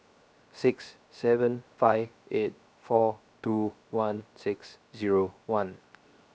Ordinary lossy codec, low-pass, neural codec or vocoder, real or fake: none; none; codec, 16 kHz, 0.7 kbps, FocalCodec; fake